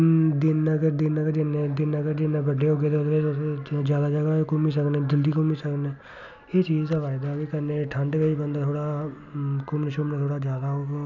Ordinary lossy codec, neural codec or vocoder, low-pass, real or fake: none; none; 7.2 kHz; real